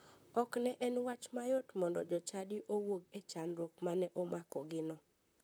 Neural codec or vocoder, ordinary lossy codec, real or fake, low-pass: vocoder, 44.1 kHz, 128 mel bands, Pupu-Vocoder; none; fake; none